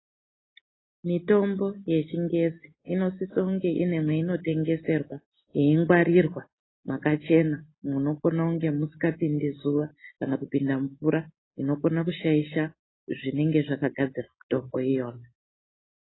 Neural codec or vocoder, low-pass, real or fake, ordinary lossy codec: none; 7.2 kHz; real; AAC, 16 kbps